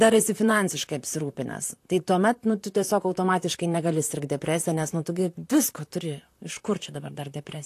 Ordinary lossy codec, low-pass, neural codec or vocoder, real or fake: AAC, 64 kbps; 14.4 kHz; vocoder, 44.1 kHz, 128 mel bands, Pupu-Vocoder; fake